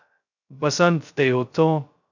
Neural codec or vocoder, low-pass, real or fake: codec, 16 kHz, 0.2 kbps, FocalCodec; 7.2 kHz; fake